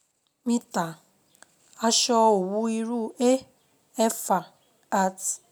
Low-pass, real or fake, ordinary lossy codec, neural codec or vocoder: none; real; none; none